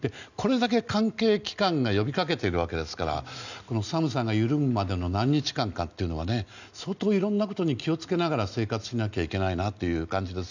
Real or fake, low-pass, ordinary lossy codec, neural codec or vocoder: real; 7.2 kHz; none; none